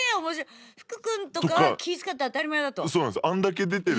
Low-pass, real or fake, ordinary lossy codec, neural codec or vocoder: none; real; none; none